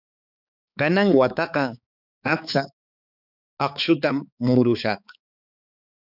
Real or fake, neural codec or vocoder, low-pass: fake; codec, 16 kHz, 4 kbps, X-Codec, HuBERT features, trained on balanced general audio; 5.4 kHz